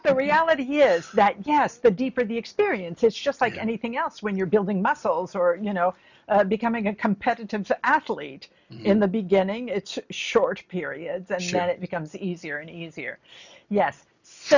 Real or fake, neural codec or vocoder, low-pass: real; none; 7.2 kHz